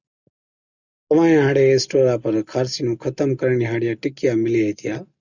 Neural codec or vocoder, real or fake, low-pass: none; real; 7.2 kHz